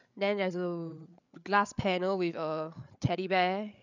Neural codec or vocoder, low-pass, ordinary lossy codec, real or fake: codec, 16 kHz, 8 kbps, FreqCodec, larger model; 7.2 kHz; none; fake